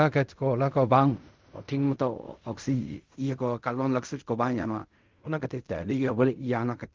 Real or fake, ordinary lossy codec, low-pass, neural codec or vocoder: fake; Opus, 32 kbps; 7.2 kHz; codec, 16 kHz in and 24 kHz out, 0.4 kbps, LongCat-Audio-Codec, fine tuned four codebook decoder